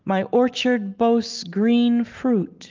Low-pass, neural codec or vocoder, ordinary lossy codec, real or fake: 7.2 kHz; none; Opus, 32 kbps; real